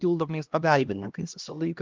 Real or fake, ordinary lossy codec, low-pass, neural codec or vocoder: fake; Opus, 32 kbps; 7.2 kHz; codec, 16 kHz, 1 kbps, X-Codec, HuBERT features, trained on LibriSpeech